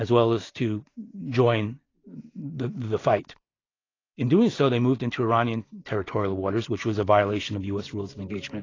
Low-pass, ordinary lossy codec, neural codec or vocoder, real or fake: 7.2 kHz; AAC, 32 kbps; none; real